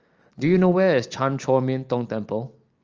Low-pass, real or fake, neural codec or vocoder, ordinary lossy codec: 7.2 kHz; real; none; Opus, 24 kbps